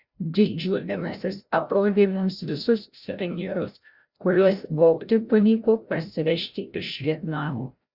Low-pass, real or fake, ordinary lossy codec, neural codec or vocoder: 5.4 kHz; fake; Opus, 64 kbps; codec, 16 kHz, 0.5 kbps, FreqCodec, larger model